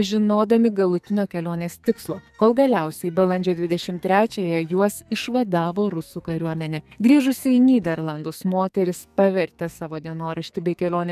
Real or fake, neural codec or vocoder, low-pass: fake; codec, 44.1 kHz, 2.6 kbps, SNAC; 14.4 kHz